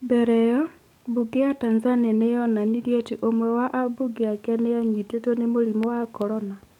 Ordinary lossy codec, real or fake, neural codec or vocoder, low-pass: none; fake; codec, 44.1 kHz, 7.8 kbps, DAC; 19.8 kHz